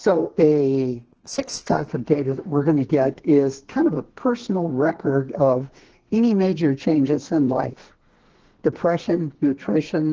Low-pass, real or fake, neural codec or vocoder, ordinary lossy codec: 7.2 kHz; fake; codec, 32 kHz, 1.9 kbps, SNAC; Opus, 16 kbps